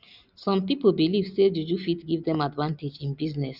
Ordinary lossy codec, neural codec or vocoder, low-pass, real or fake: none; none; 5.4 kHz; real